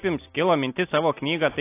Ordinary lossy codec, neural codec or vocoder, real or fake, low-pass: AAC, 24 kbps; none; real; 3.6 kHz